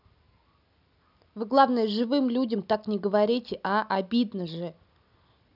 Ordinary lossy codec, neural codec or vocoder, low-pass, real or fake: none; none; 5.4 kHz; real